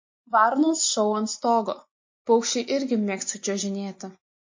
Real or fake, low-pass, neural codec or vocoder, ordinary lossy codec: real; 7.2 kHz; none; MP3, 32 kbps